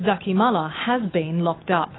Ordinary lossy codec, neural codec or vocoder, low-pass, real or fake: AAC, 16 kbps; autoencoder, 48 kHz, 128 numbers a frame, DAC-VAE, trained on Japanese speech; 7.2 kHz; fake